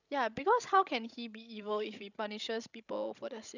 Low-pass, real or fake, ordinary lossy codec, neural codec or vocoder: 7.2 kHz; fake; none; codec, 16 kHz, 16 kbps, FreqCodec, larger model